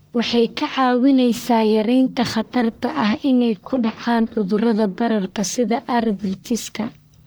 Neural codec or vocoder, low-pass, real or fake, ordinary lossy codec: codec, 44.1 kHz, 1.7 kbps, Pupu-Codec; none; fake; none